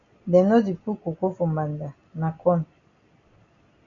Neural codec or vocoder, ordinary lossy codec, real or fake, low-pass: none; MP3, 96 kbps; real; 7.2 kHz